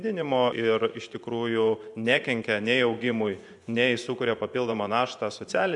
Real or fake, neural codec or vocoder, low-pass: fake; vocoder, 24 kHz, 100 mel bands, Vocos; 10.8 kHz